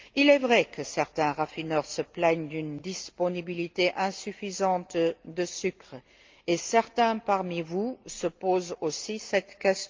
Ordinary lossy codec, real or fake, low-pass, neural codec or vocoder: Opus, 24 kbps; real; 7.2 kHz; none